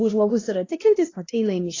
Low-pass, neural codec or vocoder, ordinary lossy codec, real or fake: 7.2 kHz; codec, 16 kHz, 1 kbps, X-Codec, HuBERT features, trained on LibriSpeech; AAC, 32 kbps; fake